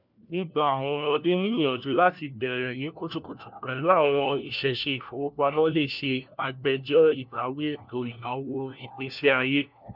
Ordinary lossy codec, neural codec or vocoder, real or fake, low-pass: none; codec, 16 kHz, 1 kbps, FunCodec, trained on LibriTTS, 50 frames a second; fake; 5.4 kHz